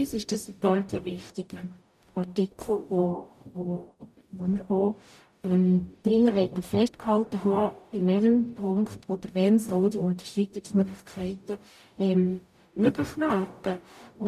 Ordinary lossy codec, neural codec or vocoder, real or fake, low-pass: none; codec, 44.1 kHz, 0.9 kbps, DAC; fake; 14.4 kHz